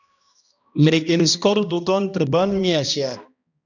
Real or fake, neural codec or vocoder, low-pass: fake; codec, 16 kHz, 1 kbps, X-Codec, HuBERT features, trained on balanced general audio; 7.2 kHz